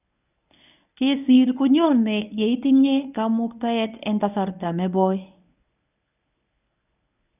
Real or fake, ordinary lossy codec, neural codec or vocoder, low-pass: fake; none; codec, 24 kHz, 0.9 kbps, WavTokenizer, medium speech release version 1; 3.6 kHz